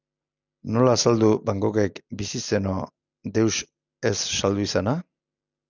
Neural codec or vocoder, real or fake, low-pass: none; real; 7.2 kHz